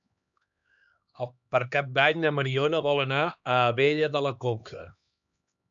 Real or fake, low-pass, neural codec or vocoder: fake; 7.2 kHz; codec, 16 kHz, 2 kbps, X-Codec, HuBERT features, trained on LibriSpeech